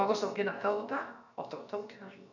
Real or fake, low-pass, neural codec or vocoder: fake; 7.2 kHz; codec, 16 kHz, about 1 kbps, DyCAST, with the encoder's durations